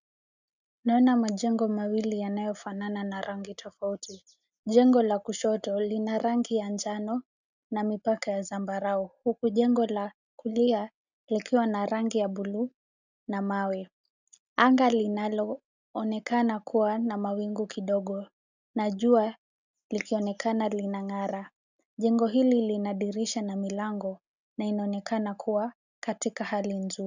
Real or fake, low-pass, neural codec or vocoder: real; 7.2 kHz; none